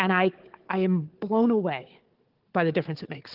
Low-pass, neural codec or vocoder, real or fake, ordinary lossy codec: 5.4 kHz; codec, 16 kHz, 4 kbps, FunCodec, trained on Chinese and English, 50 frames a second; fake; Opus, 16 kbps